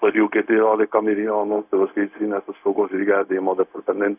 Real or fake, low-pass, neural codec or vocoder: fake; 3.6 kHz; codec, 16 kHz, 0.4 kbps, LongCat-Audio-Codec